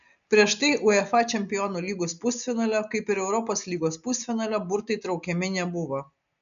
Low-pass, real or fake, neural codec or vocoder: 7.2 kHz; real; none